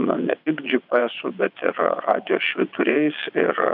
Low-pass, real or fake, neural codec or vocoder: 5.4 kHz; fake; vocoder, 22.05 kHz, 80 mel bands, WaveNeXt